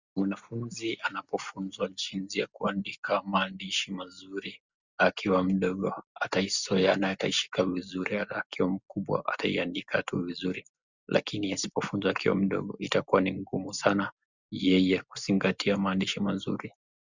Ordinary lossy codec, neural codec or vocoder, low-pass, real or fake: Opus, 64 kbps; none; 7.2 kHz; real